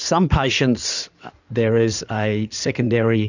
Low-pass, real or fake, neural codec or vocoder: 7.2 kHz; fake; codec, 16 kHz in and 24 kHz out, 2.2 kbps, FireRedTTS-2 codec